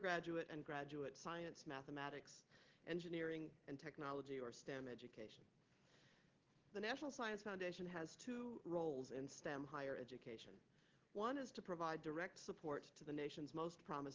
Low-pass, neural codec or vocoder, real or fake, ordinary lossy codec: 7.2 kHz; none; real; Opus, 16 kbps